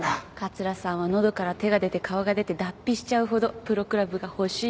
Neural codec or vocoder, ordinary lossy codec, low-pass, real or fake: none; none; none; real